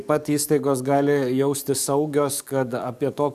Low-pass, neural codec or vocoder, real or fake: 14.4 kHz; codec, 44.1 kHz, 7.8 kbps, DAC; fake